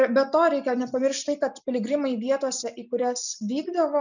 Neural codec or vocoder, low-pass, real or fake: none; 7.2 kHz; real